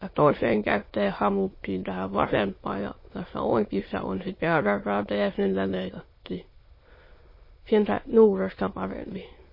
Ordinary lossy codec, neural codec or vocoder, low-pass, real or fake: MP3, 24 kbps; autoencoder, 22.05 kHz, a latent of 192 numbers a frame, VITS, trained on many speakers; 5.4 kHz; fake